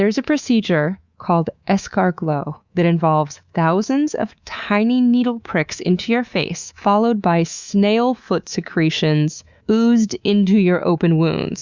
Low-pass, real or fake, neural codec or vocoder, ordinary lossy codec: 7.2 kHz; fake; codec, 24 kHz, 3.1 kbps, DualCodec; Opus, 64 kbps